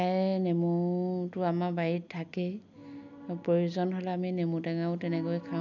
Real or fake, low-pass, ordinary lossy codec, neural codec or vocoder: real; 7.2 kHz; none; none